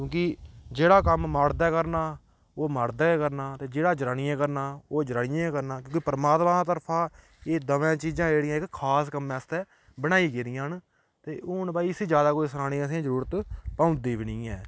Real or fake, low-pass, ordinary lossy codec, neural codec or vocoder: real; none; none; none